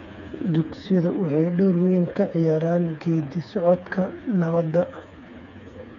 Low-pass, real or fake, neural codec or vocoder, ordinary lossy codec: 7.2 kHz; fake; codec, 16 kHz, 4 kbps, FreqCodec, smaller model; none